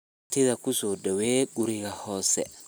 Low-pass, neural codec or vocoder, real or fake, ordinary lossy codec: none; none; real; none